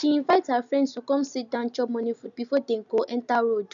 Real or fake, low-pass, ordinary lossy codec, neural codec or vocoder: real; 7.2 kHz; none; none